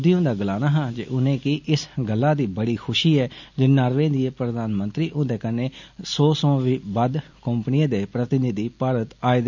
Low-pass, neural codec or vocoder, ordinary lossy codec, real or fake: 7.2 kHz; none; none; real